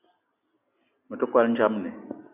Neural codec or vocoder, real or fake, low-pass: none; real; 3.6 kHz